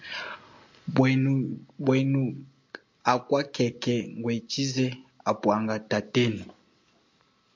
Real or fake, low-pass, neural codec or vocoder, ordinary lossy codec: real; 7.2 kHz; none; MP3, 48 kbps